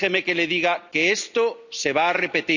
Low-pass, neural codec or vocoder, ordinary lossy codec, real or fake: 7.2 kHz; none; none; real